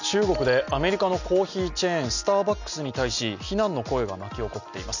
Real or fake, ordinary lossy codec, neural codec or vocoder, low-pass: real; none; none; 7.2 kHz